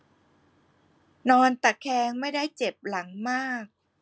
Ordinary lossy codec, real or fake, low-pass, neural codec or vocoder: none; real; none; none